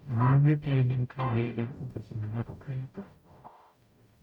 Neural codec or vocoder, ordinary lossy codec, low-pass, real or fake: codec, 44.1 kHz, 0.9 kbps, DAC; none; 19.8 kHz; fake